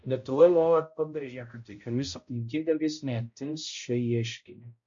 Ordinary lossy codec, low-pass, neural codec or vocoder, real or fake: MP3, 48 kbps; 7.2 kHz; codec, 16 kHz, 0.5 kbps, X-Codec, HuBERT features, trained on balanced general audio; fake